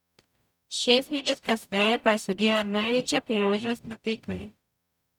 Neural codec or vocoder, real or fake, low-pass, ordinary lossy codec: codec, 44.1 kHz, 0.9 kbps, DAC; fake; 19.8 kHz; none